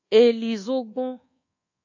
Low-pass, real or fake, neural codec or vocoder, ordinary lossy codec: 7.2 kHz; fake; codec, 24 kHz, 1.2 kbps, DualCodec; AAC, 48 kbps